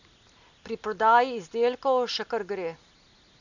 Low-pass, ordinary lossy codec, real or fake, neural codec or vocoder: 7.2 kHz; none; real; none